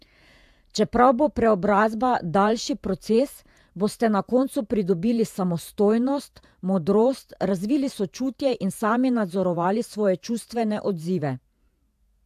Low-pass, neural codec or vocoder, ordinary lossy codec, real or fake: 14.4 kHz; vocoder, 48 kHz, 128 mel bands, Vocos; AAC, 96 kbps; fake